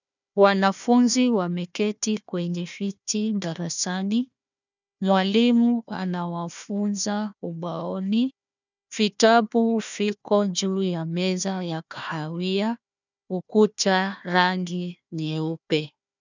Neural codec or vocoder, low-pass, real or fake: codec, 16 kHz, 1 kbps, FunCodec, trained on Chinese and English, 50 frames a second; 7.2 kHz; fake